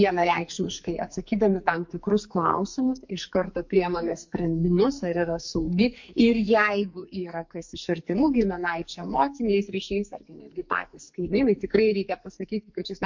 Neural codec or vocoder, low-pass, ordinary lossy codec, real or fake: codec, 44.1 kHz, 2.6 kbps, SNAC; 7.2 kHz; MP3, 48 kbps; fake